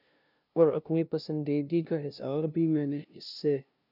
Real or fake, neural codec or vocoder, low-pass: fake; codec, 16 kHz, 0.5 kbps, FunCodec, trained on LibriTTS, 25 frames a second; 5.4 kHz